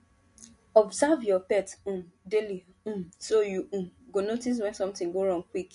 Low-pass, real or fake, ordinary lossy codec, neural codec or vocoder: 10.8 kHz; real; MP3, 48 kbps; none